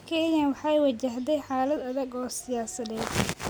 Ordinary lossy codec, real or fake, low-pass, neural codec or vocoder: none; real; none; none